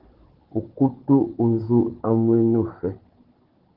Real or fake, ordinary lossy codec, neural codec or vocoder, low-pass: fake; Opus, 16 kbps; codec, 16 kHz, 16 kbps, FunCodec, trained on Chinese and English, 50 frames a second; 5.4 kHz